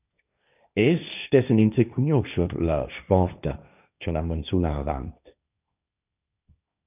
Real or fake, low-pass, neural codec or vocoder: fake; 3.6 kHz; codec, 16 kHz, 1.1 kbps, Voila-Tokenizer